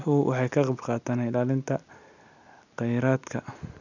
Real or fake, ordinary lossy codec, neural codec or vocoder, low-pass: real; none; none; 7.2 kHz